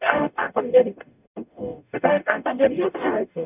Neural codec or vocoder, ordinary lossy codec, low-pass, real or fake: codec, 44.1 kHz, 0.9 kbps, DAC; none; 3.6 kHz; fake